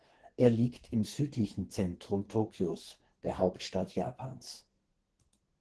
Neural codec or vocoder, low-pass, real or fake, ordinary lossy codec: codec, 32 kHz, 1.9 kbps, SNAC; 10.8 kHz; fake; Opus, 16 kbps